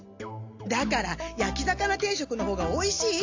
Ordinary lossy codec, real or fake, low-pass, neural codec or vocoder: AAC, 48 kbps; real; 7.2 kHz; none